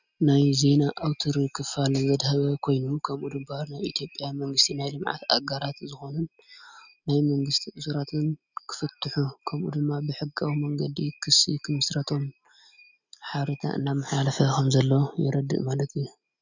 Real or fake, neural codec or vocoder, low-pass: real; none; 7.2 kHz